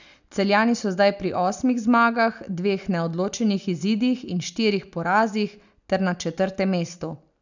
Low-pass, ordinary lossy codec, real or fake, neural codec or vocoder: 7.2 kHz; none; real; none